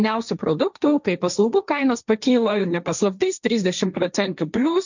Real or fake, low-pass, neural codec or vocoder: fake; 7.2 kHz; codec, 16 kHz, 1.1 kbps, Voila-Tokenizer